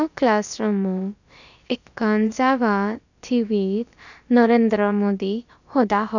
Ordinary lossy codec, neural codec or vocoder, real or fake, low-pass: none; codec, 16 kHz, about 1 kbps, DyCAST, with the encoder's durations; fake; 7.2 kHz